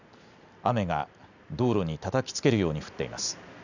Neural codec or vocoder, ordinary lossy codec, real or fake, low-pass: none; none; real; 7.2 kHz